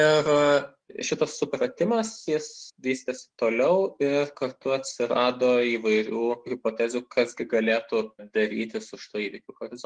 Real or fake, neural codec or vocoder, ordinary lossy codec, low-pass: fake; autoencoder, 48 kHz, 128 numbers a frame, DAC-VAE, trained on Japanese speech; Opus, 24 kbps; 9.9 kHz